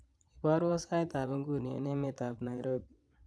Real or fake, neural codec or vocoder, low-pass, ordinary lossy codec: fake; vocoder, 22.05 kHz, 80 mel bands, Vocos; none; none